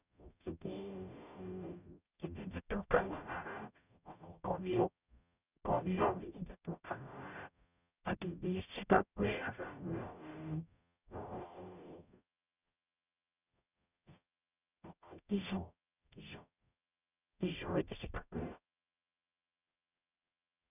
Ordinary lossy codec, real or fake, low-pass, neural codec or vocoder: none; fake; 3.6 kHz; codec, 44.1 kHz, 0.9 kbps, DAC